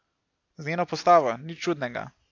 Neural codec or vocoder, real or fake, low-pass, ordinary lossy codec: none; real; 7.2 kHz; AAC, 48 kbps